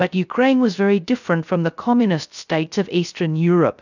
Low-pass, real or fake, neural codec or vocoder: 7.2 kHz; fake; codec, 16 kHz, 0.3 kbps, FocalCodec